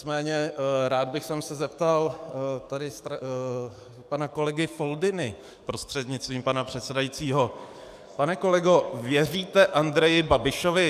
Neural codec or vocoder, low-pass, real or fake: codec, 44.1 kHz, 7.8 kbps, DAC; 14.4 kHz; fake